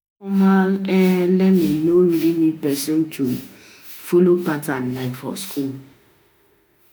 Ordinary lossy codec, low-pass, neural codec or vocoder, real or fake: none; none; autoencoder, 48 kHz, 32 numbers a frame, DAC-VAE, trained on Japanese speech; fake